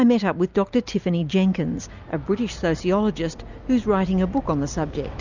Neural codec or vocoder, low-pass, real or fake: none; 7.2 kHz; real